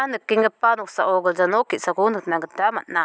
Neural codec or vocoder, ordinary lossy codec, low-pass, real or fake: none; none; none; real